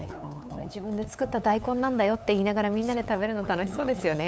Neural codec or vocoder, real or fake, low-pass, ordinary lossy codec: codec, 16 kHz, 8 kbps, FunCodec, trained on LibriTTS, 25 frames a second; fake; none; none